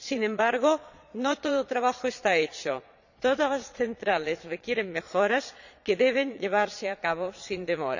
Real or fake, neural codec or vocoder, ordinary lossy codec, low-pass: fake; vocoder, 22.05 kHz, 80 mel bands, Vocos; none; 7.2 kHz